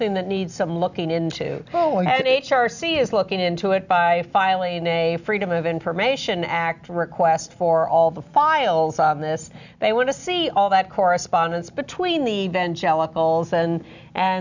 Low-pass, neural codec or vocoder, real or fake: 7.2 kHz; none; real